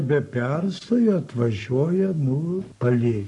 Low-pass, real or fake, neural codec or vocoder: 10.8 kHz; fake; codec, 44.1 kHz, 7.8 kbps, Pupu-Codec